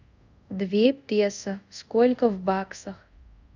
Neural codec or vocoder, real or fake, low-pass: codec, 24 kHz, 0.5 kbps, DualCodec; fake; 7.2 kHz